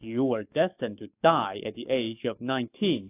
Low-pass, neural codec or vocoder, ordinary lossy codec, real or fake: 3.6 kHz; codec, 44.1 kHz, 7.8 kbps, DAC; AAC, 24 kbps; fake